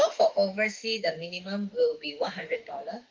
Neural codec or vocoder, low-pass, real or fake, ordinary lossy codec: autoencoder, 48 kHz, 32 numbers a frame, DAC-VAE, trained on Japanese speech; 7.2 kHz; fake; Opus, 32 kbps